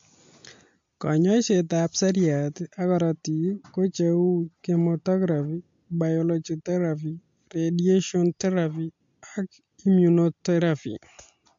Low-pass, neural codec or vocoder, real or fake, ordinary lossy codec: 7.2 kHz; none; real; MP3, 48 kbps